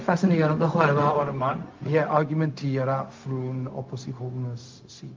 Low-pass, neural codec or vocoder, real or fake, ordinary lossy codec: 7.2 kHz; codec, 16 kHz, 0.4 kbps, LongCat-Audio-Codec; fake; Opus, 32 kbps